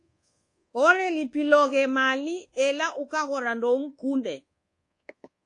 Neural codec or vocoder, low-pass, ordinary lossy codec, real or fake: codec, 24 kHz, 1.2 kbps, DualCodec; 10.8 kHz; AAC, 48 kbps; fake